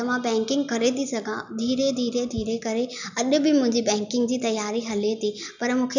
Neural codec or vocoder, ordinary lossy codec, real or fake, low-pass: none; none; real; 7.2 kHz